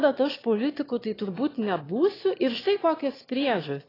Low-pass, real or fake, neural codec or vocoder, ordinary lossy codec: 5.4 kHz; fake; autoencoder, 22.05 kHz, a latent of 192 numbers a frame, VITS, trained on one speaker; AAC, 24 kbps